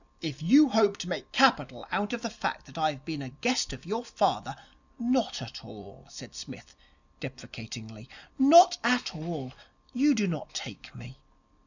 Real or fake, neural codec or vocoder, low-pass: real; none; 7.2 kHz